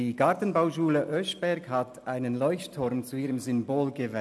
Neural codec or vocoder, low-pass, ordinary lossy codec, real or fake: none; none; none; real